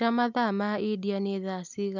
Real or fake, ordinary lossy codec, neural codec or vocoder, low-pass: real; none; none; 7.2 kHz